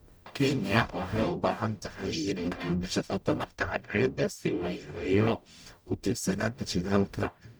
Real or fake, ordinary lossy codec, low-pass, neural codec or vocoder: fake; none; none; codec, 44.1 kHz, 0.9 kbps, DAC